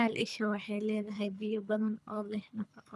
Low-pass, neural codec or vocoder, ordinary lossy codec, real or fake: none; codec, 24 kHz, 3 kbps, HILCodec; none; fake